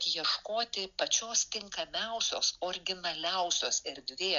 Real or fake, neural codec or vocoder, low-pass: real; none; 7.2 kHz